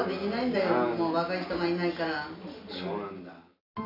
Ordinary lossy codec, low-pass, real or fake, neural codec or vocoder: none; 5.4 kHz; real; none